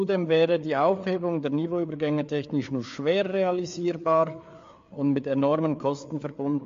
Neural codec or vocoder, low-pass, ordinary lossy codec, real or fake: codec, 16 kHz, 4 kbps, FreqCodec, larger model; 7.2 kHz; MP3, 48 kbps; fake